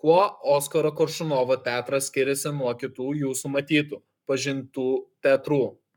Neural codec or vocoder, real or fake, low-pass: codec, 44.1 kHz, 7.8 kbps, Pupu-Codec; fake; 19.8 kHz